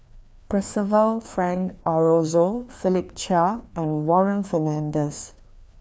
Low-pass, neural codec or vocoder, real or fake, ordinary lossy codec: none; codec, 16 kHz, 2 kbps, FreqCodec, larger model; fake; none